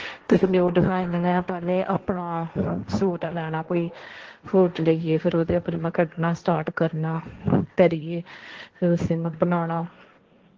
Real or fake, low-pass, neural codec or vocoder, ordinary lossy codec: fake; 7.2 kHz; codec, 16 kHz, 1.1 kbps, Voila-Tokenizer; Opus, 16 kbps